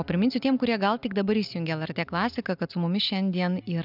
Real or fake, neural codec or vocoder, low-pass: real; none; 5.4 kHz